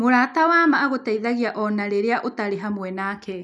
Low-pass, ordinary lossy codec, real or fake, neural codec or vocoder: none; none; real; none